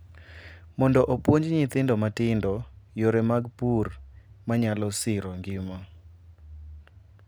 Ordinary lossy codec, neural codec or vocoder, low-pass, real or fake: none; none; none; real